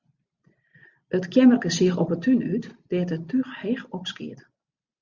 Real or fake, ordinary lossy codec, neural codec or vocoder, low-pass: real; Opus, 64 kbps; none; 7.2 kHz